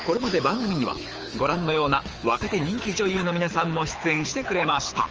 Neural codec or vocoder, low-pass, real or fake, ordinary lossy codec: codec, 24 kHz, 6 kbps, HILCodec; 7.2 kHz; fake; Opus, 24 kbps